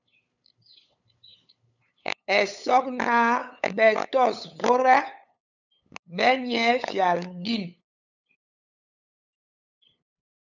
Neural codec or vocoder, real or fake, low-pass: codec, 16 kHz, 8 kbps, FunCodec, trained on LibriTTS, 25 frames a second; fake; 7.2 kHz